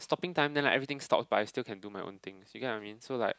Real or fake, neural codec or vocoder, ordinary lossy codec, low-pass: real; none; none; none